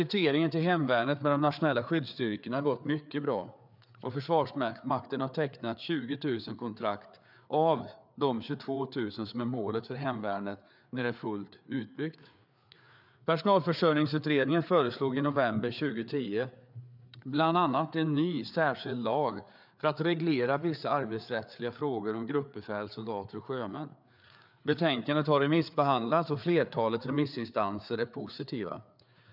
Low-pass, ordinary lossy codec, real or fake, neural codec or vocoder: 5.4 kHz; none; fake; codec, 16 kHz, 4 kbps, FreqCodec, larger model